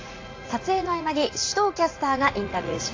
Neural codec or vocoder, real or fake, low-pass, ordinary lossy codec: vocoder, 44.1 kHz, 80 mel bands, Vocos; fake; 7.2 kHz; AAC, 32 kbps